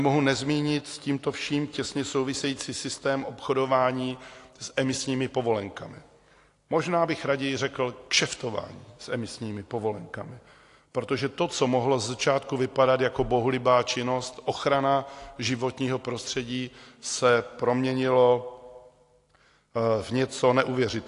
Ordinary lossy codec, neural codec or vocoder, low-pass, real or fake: AAC, 48 kbps; none; 10.8 kHz; real